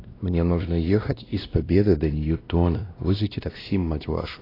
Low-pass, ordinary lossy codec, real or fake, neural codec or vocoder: 5.4 kHz; AAC, 24 kbps; fake; codec, 16 kHz, 1 kbps, X-Codec, WavLM features, trained on Multilingual LibriSpeech